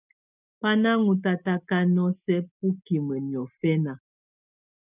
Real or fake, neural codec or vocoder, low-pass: real; none; 3.6 kHz